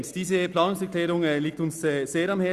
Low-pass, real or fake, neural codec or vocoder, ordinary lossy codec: 14.4 kHz; real; none; Opus, 64 kbps